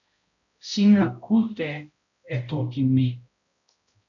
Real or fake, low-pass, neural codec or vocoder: fake; 7.2 kHz; codec, 16 kHz, 0.5 kbps, X-Codec, HuBERT features, trained on balanced general audio